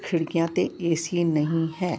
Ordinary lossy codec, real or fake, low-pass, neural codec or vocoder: none; real; none; none